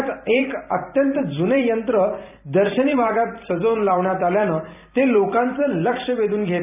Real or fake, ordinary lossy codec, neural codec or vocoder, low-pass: fake; none; vocoder, 44.1 kHz, 128 mel bands every 256 samples, BigVGAN v2; 3.6 kHz